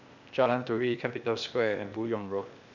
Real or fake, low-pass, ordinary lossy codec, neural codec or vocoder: fake; 7.2 kHz; none; codec, 16 kHz, 0.8 kbps, ZipCodec